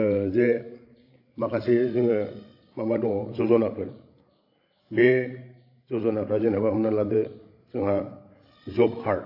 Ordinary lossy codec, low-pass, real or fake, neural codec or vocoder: AAC, 32 kbps; 5.4 kHz; fake; codec, 16 kHz, 16 kbps, FreqCodec, larger model